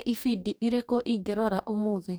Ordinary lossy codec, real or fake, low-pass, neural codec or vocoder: none; fake; none; codec, 44.1 kHz, 2.6 kbps, DAC